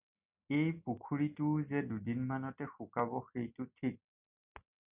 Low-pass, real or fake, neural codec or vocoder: 3.6 kHz; real; none